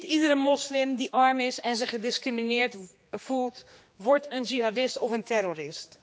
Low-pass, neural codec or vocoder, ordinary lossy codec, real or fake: none; codec, 16 kHz, 2 kbps, X-Codec, HuBERT features, trained on general audio; none; fake